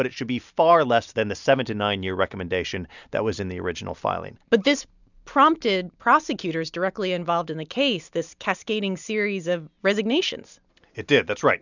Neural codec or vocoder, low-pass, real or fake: none; 7.2 kHz; real